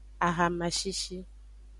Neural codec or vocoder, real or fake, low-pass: none; real; 10.8 kHz